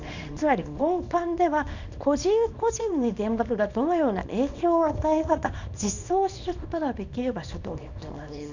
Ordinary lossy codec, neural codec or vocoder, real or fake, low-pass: none; codec, 24 kHz, 0.9 kbps, WavTokenizer, small release; fake; 7.2 kHz